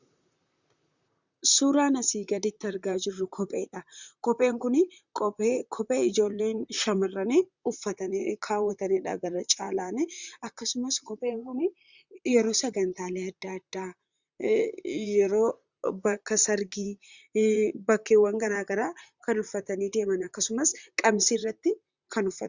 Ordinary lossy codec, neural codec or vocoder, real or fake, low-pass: Opus, 64 kbps; vocoder, 44.1 kHz, 128 mel bands, Pupu-Vocoder; fake; 7.2 kHz